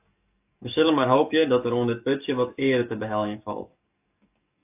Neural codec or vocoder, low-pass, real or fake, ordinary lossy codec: codec, 44.1 kHz, 7.8 kbps, DAC; 3.6 kHz; fake; AAC, 32 kbps